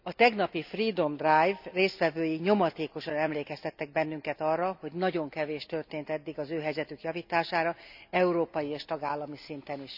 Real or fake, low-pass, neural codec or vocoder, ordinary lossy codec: real; 5.4 kHz; none; none